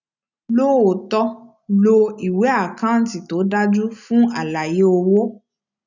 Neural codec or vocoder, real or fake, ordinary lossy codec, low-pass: none; real; none; 7.2 kHz